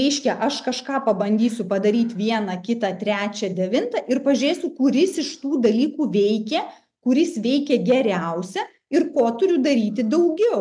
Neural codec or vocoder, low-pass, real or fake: none; 9.9 kHz; real